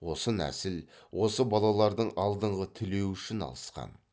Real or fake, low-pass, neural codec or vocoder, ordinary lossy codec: real; none; none; none